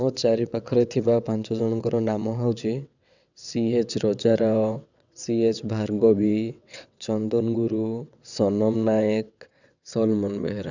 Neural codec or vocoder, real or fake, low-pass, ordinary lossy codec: vocoder, 22.05 kHz, 80 mel bands, WaveNeXt; fake; 7.2 kHz; none